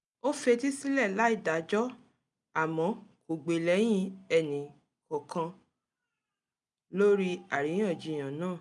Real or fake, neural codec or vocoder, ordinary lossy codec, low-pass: real; none; none; 10.8 kHz